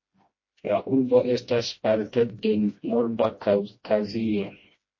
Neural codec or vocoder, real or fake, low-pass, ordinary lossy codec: codec, 16 kHz, 1 kbps, FreqCodec, smaller model; fake; 7.2 kHz; MP3, 32 kbps